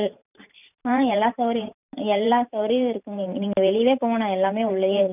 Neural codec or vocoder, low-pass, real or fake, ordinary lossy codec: vocoder, 44.1 kHz, 128 mel bands every 512 samples, BigVGAN v2; 3.6 kHz; fake; none